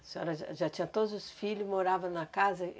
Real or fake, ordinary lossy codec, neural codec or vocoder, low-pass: real; none; none; none